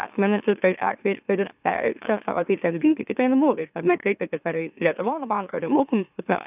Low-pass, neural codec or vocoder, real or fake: 3.6 kHz; autoencoder, 44.1 kHz, a latent of 192 numbers a frame, MeloTTS; fake